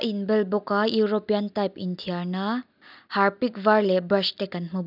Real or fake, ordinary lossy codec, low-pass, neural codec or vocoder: real; none; 5.4 kHz; none